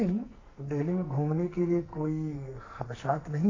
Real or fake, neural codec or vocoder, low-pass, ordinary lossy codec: fake; codec, 44.1 kHz, 2.6 kbps, SNAC; 7.2 kHz; AAC, 32 kbps